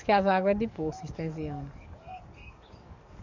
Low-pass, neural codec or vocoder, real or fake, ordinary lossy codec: 7.2 kHz; codec, 44.1 kHz, 7.8 kbps, DAC; fake; none